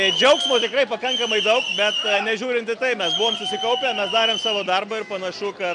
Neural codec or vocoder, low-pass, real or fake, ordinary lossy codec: none; 9.9 kHz; real; MP3, 96 kbps